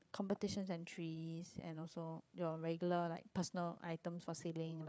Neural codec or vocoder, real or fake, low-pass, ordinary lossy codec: codec, 16 kHz, 8 kbps, FreqCodec, larger model; fake; none; none